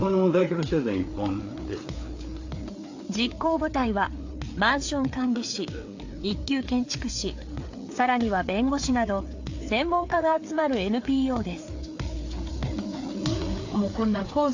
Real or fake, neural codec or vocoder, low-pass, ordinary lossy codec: fake; codec, 16 kHz, 4 kbps, FreqCodec, larger model; 7.2 kHz; AAC, 48 kbps